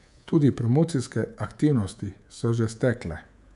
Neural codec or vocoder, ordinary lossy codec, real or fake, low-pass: codec, 24 kHz, 3.1 kbps, DualCodec; none; fake; 10.8 kHz